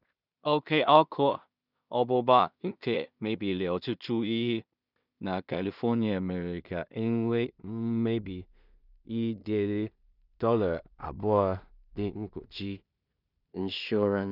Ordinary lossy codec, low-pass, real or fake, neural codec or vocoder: none; 5.4 kHz; fake; codec, 16 kHz in and 24 kHz out, 0.4 kbps, LongCat-Audio-Codec, two codebook decoder